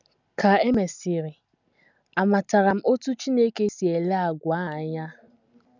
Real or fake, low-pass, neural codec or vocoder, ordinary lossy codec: real; 7.2 kHz; none; none